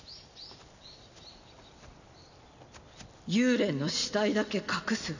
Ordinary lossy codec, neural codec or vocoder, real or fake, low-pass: none; none; real; 7.2 kHz